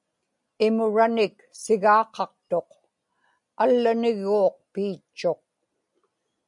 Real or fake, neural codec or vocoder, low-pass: real; none; 10.8 kHz